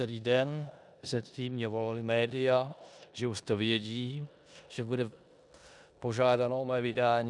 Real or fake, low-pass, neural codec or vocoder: fake; 10.8 kHz; codec, 16 kHz in and 24 kHz out, 0.9 kbps, LongCat-Audio-Codec, four codebook decoder